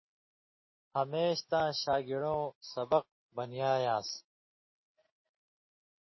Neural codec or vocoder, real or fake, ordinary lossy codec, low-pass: none; real; MP3, 24 kbps; 7.2 kHz